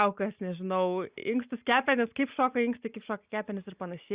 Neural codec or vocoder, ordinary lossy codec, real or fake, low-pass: none; Opus, 64 kbps; real; 3.6 kHz